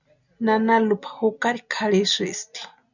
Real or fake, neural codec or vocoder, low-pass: real; none; 7.2 kHz